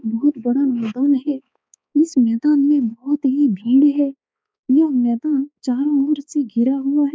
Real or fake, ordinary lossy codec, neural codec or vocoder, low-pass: fake; none; codec, 16 kHz, 4 kbps, X-Codec, HuBERT features, trained on balanced general audio; none